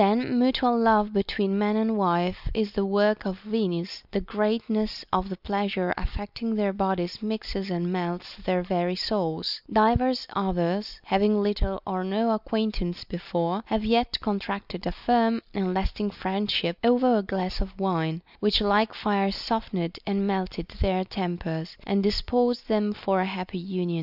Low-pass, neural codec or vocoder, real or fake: 5.4 kHz; none; real